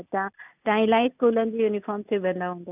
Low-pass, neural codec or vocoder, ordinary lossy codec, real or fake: 3.6 kHz; none; none; real